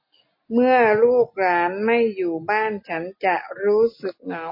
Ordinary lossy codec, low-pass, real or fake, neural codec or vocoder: MP3, 24 kbps; 5.4 kHz; real; none